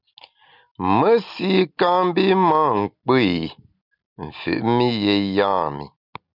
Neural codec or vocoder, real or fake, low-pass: none; real; 5.4 kHz